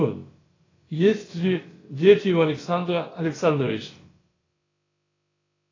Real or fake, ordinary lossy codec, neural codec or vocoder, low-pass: fake; AAC, 32 kbps; codec, 16 kHz, about 1 kbps, DyCAST, with the encoder's durations; 7.2 kHz